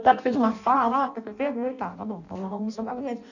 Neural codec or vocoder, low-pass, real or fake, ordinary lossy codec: codec, 16 kHz in and 24 kHz out, 0.6 kbps, FireRedTTS-2 codec; 7.2 kHz; fake; none